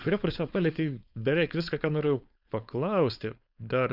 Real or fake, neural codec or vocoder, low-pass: fake; codec, 16 kHz, 4.8 kbps, FACodec; 5.4 kHz